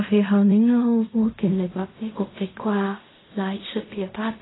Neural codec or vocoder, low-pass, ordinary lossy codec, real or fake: codec, 16 kHz in and 24 kHz out, 0.4 kbps, LongCat-Audio-Codec, fine tuned four codebook decoder; 7.2 kHz; AAC, 16 kbps; fake